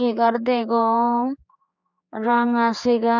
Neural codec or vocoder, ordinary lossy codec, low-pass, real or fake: codec, 16 kHz, 4 kbps, X-Codec, HuBERT features, trained on general audio; none; 7.2 kHz; fake